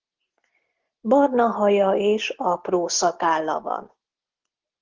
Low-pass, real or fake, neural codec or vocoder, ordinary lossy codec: 7.2 kHz; real; none; Opus, 16 kbps